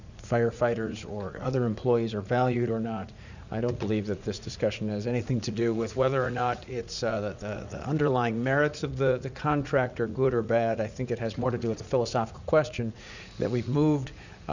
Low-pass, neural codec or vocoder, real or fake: 7.2 kHz; vocoder, 44.1 kHz, 80 mel bands, Vocos; fake